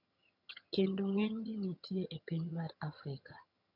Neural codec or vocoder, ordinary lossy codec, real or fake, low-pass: vocoder, 22.05 kHz, 80 mel bands, HiFi-GAN; none; fake; 5.4 kHz